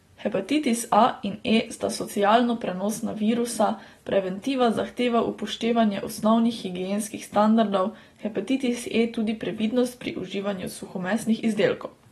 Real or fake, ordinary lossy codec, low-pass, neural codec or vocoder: real; AAC, 32 kbps; 14.4 kHz; none